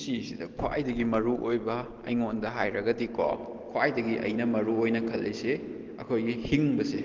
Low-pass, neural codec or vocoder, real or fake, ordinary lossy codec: 7.2 kHz; none; real; Opus, 16 kbps